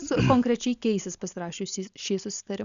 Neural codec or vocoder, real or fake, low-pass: none; real; 7.2 kHz